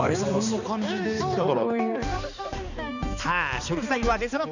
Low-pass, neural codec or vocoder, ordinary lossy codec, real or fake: 7.2 kHz; codec, 16 kHz, 2 kbps, X-Codec, HuBERT features, trained on balanced general audio; none; fake